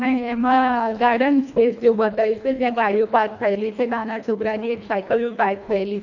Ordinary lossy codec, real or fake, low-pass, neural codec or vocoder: none; fake; 7.2 kHz; codec, 24 kHz, 1.5 kbps, HILCodec